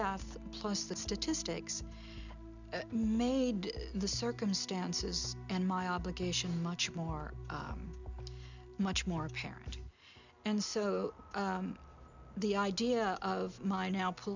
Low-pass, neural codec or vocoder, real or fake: 7.2 kHz; none; real